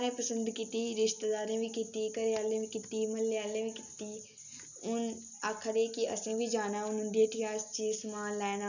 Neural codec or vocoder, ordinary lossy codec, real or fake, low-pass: none; none; real; 7.2 kHz